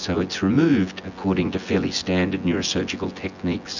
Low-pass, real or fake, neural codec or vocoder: 7.2 kHz; fake; vocoder, 24 kHz, 100 mel bands, Vocos